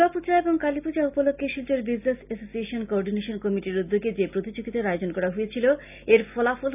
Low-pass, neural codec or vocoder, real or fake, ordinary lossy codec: 3.6 kHz; none; real; none